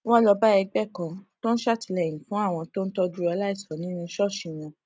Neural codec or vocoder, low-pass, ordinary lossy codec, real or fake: none; none; none; real